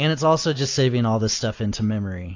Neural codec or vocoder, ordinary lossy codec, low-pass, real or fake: none; AAC, 48 kbps; 7.2 kHz; real